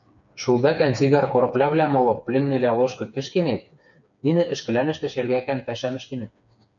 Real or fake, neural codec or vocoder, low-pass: fake; codec, 16 kHz, 4 kbps, FreqCodec, smaller model; 7.2 kHz